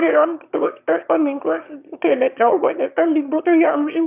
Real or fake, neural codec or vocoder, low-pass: fake; autoencoder, 22.05 kHz, a latent of 192 numbers a frame, VITS, trained on one speaker; 3.6 kHz